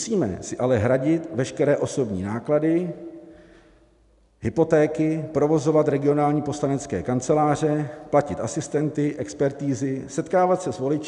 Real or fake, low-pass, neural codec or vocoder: real; 10.8 kHz; none